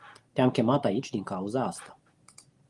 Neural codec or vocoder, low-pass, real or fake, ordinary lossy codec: none; 10.8 kHz; real; Opus, 24 kbps